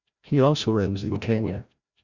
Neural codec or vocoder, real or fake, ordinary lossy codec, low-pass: codec, 16 kHz, 0.5 kbps, FreqCodec, larger model; fake; Opus, 64 kbps; 7.2 kHz